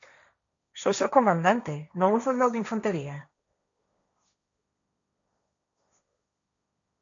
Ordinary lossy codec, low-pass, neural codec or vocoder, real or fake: MP3, 64 kbps; 7.2 kHz; codec, 16 kHz, 1.1 kbps, Voila-Tokenizer; fake